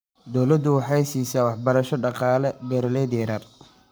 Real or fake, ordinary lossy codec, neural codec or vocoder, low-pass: fake; none; codec, 44.1 kHz, 7.8 kbps, Pupu-Codec; none